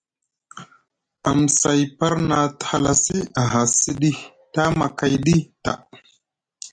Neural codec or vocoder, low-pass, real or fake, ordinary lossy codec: none; 9.9 kHz; real; MP3, 64 kbps